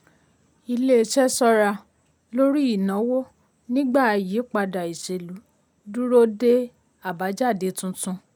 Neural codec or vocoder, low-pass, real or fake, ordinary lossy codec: none; none; real; none